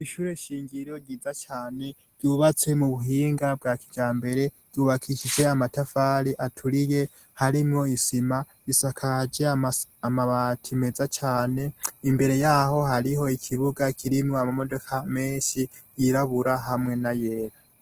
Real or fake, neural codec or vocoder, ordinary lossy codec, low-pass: real; none; Opus, 16 kbps; 14.4 kHz